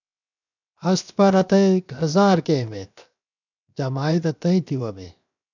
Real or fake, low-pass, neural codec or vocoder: fake; 7.2 kHz; codec, 16 kHz, 0.7 kbps, FocalCodec